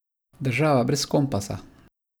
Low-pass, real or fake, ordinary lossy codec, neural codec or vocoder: none; real; none; none